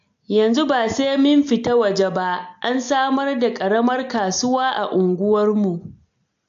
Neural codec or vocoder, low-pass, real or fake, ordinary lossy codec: none; 7.2 kHz; real; AAC, 64 kbps